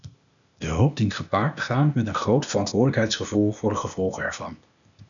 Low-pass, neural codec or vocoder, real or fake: 7.2 kHz; codec, 16 kHz, 0.8 kbps, ZipCodec; fake